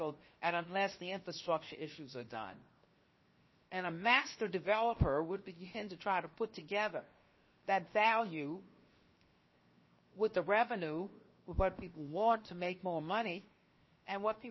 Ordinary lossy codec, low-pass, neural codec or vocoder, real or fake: MP3, 24 kbps; 7.2 kHz; codec, 16 kHz, 0.7 kbps, FocalCodec; fake